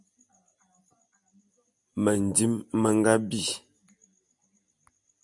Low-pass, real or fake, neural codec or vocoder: 10.8 kHz; real; none